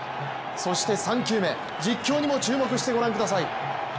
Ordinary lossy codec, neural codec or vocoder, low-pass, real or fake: none; none; none; real